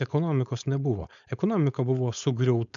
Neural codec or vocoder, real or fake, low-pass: codec, 16 kHz, 4.8 kbps, FACodec; fake; 7.2 kHz